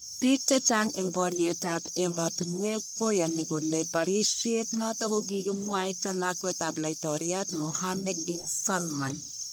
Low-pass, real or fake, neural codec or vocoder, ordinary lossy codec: none; fake; codec, 44.1 kHz, 1.7 kbps, Pupu-Codec; none